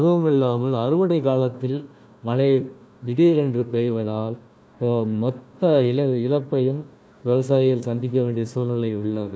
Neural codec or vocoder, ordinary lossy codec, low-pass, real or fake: codec, 16 kHz, 1 kbps, FunCodec, trained on Chinese and English, 50 frames a second; none; none; fake